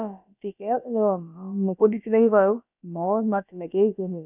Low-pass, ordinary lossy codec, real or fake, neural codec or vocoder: 3.6 kHz; none; fake; codec, 16 kHz, about 1 kbps, DyCAST, with the encoder's durations